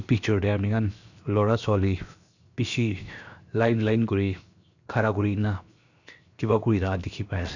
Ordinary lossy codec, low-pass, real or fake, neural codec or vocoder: none; 7.2 kHz; fake; codec, 16 kHz, 0.7 kbps, FocalCodec